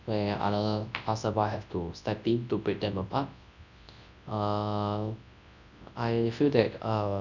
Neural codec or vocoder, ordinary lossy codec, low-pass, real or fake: codec, 24 kHz, 0.9 kbps, WavTokenizer, large speech release; none; 7.2 kHz; fake